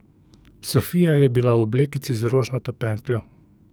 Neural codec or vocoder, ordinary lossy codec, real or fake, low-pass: codec, 44.1 kHz, 2.6 kbps, SNAC; none; fake; none